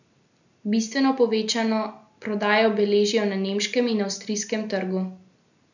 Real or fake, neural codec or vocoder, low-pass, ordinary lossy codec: real; none; 7.2 kHz; none